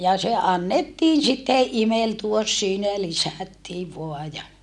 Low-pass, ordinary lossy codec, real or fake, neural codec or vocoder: none; none; real; none